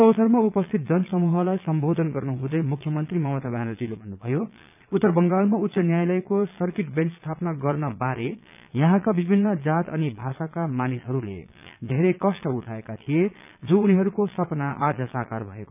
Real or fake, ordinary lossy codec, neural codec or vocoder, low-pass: fake; none; vocoder, 22.05 kHz, 80 mel bands, Vocos; 3.6 kHz